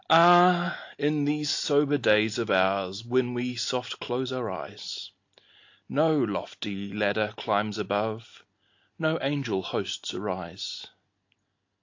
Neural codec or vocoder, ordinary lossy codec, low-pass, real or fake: none; AAC, 48 kbps; 7.2 kHz; real